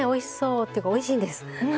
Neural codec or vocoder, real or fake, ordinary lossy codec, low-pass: none; real; none; none